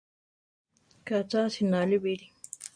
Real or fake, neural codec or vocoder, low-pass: real; none; 9.9 kHz